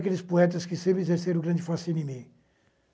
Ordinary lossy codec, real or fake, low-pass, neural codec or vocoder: none; real; none; none